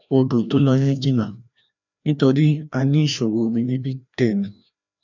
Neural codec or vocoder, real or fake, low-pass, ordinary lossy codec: codec, 16 kHz, 1 kbps, FreqCodec, larger model; fake; 7.2 kHz; none